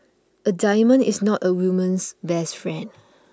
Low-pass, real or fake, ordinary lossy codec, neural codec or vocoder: none; real; none; none